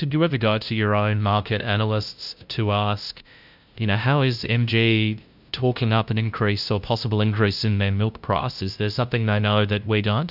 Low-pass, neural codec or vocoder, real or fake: 5.4 kHz; codec, 16 kHz, 0.5 kbps, FunCodec, trained on LibriTTS, 25 frames a second; fake